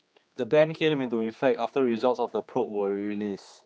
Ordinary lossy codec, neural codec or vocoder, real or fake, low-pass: none; codec, 16 kHz, 2 kbps, X-Codec, HuBERT features, trained on general audio; fake; none